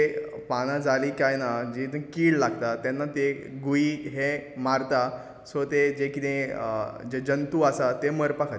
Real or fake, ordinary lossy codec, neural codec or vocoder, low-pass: real; none; none; none